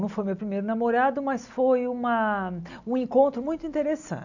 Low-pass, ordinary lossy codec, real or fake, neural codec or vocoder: 7.2 kHz; none; real; none